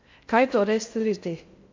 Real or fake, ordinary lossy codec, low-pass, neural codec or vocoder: fake; MP3, 48 kbps; 7.2 kHz; codec, 16 kHz in and 24 kHz out, 0.6 kbps, FocalCodec, streaming, 2048 codes